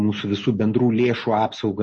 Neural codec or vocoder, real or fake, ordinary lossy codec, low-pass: none; real; MP3, 32 kbps; 9.9 kHz